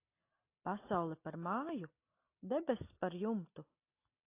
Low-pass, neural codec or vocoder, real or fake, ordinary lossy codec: 3.6 kHz; none; real; AAC, 24 kbps